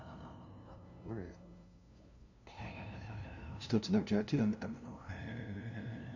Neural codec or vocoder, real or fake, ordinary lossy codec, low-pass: codec, 16 kHz, 0.5 kbps, FunCodec, trained on LibriTTS, 25 frames a second; fake; none; 7.2 kHz